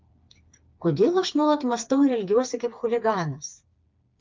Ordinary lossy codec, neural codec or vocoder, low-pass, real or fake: Opus, 24 kbps; codec, 16 kHz, 4 kbps, FreqCodec, smaller model; 7.2 kHz; fake